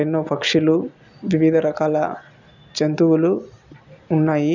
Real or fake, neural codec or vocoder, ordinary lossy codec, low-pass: real; none; none; 7.2 kHz